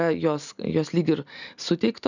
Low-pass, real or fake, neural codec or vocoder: 7.2 kHz; real; none